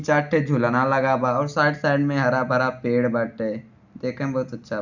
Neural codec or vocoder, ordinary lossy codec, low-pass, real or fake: none; none; 7.2 kHz; real